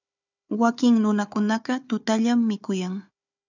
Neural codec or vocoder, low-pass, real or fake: codec, 16 kHz, 4 kbps, FunCodec, trained on Chinese and English, 50 frames a second; 7.2 kHz; fake